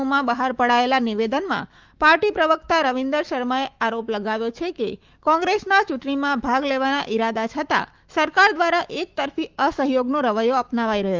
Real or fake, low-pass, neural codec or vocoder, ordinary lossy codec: fake; 7.2 kHz; autoencoder, 48 kHz, 128 numbers a frame, DAC-VAE, trained on Japanese speech; Opus, 32 kbps